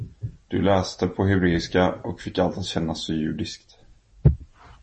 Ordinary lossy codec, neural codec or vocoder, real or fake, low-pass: MP3, 32 kbps; none; real; 10.8 kHz